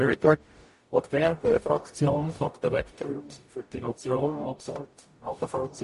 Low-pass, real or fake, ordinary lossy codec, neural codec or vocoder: 14.4 kHz; fake; MP3, 48 kbps; codec, 44.1 kHz, 0.9 kbps, DAC